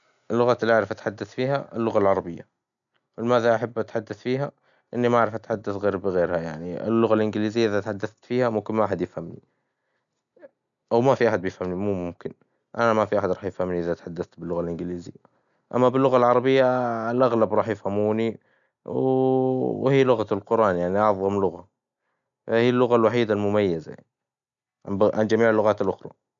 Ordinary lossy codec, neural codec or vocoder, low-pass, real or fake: none; none; 7.2 kHz; real